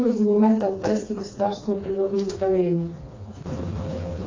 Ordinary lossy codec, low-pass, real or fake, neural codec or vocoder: AAC, 32 kbps; 7.2 kHz; fake; codec, 16 kHz, 2 kbps, FreqCodec, smaller model